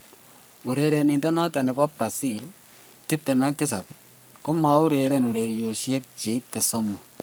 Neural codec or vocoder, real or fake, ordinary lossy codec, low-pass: codec, 44.1 kHz, 3.4 kbps, Pupu-Codec; fake; none; none